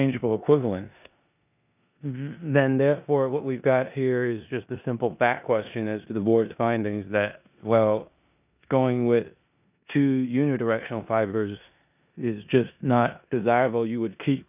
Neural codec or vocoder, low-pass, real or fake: codec, 16 kHz in and 24 kHz out, 0.9 kbps, LongCat-Audio-Codec, four codebook decoder; 3.6 kHz; fake